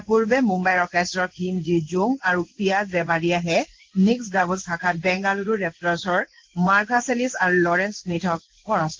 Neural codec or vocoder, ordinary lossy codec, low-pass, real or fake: codec, 16 kHz in and 24 kHz out, 1 kbps, XY-Tokenizer; Opus, 16 kbps; 7.2 kHz; fake